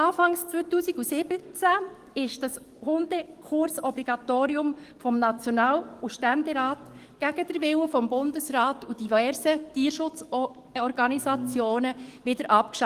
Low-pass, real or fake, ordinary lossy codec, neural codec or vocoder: 14.4 kHz; fake; Opus, 24 kbps; codec, 44.1 kHz, 7.8 kbps, DAC